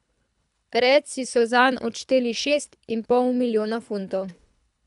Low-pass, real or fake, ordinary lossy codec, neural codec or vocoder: 10.8 kHz; fake; none; codec, 24 kHz, 3 kbps, HILCodec